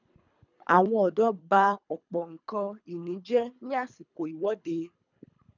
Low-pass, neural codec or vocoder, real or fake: 7.2 kHz; codec, 24 kHz, 3 kbps, HILCodec; fake